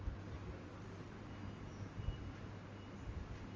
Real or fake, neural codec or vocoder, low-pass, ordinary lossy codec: real; none; 7.2 kHz; Opus, 32 kbps